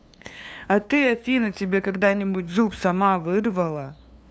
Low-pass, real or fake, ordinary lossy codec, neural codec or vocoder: none; fake; none; codec, 16 kHz, 2 kbps, FunCodec, trained on LibriTTS, 25 frames a second